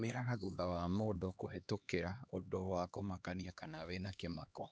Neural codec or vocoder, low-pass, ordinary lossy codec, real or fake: codec, 16 kHz, 2 kbps, X-Codec, HuBERT features, trained on LibriSpeech; none; none; fake